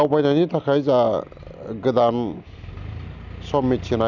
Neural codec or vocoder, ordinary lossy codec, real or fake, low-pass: none; none; real; 7.2 kHz